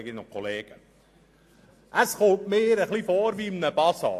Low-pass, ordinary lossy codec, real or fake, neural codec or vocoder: 14.4 kHz; AAC, 64 kbps; real; none